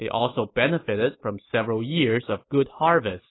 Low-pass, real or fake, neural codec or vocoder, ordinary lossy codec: 7.2 kHz; real; none; AAC, 16 kbps